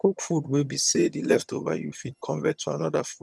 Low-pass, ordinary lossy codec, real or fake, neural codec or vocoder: none; none; fake; vocoder, 22.05 kHz, 80 mel bands, HiFi-GAN